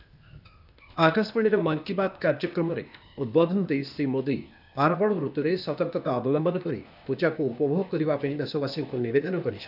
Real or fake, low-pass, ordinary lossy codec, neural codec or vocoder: fake; 5.4 kHz; none; codec, 16 kHz, 0.8 kbps, ZipCodec